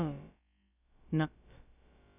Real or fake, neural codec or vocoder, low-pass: fake; codec, 16 kHz, about 1 kbps, DyCAST, with the encoder's durations; 3.6 kHz